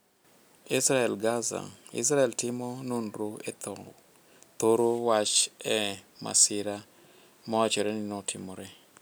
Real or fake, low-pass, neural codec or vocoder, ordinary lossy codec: real; none; none; none